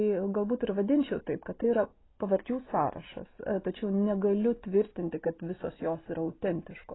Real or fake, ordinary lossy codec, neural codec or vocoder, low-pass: real; AAC, 16 kbps; none; 7.2 kHz